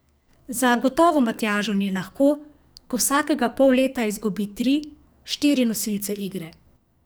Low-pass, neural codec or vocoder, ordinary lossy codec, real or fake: none; codec, 44.1 kHz, 2.6 kbps, SNAC; none; fake